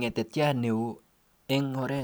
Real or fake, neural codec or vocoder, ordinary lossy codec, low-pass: real; none; none; none